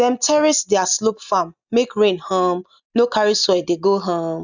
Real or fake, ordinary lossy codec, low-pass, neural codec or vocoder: real; none; 7.2 kHz; none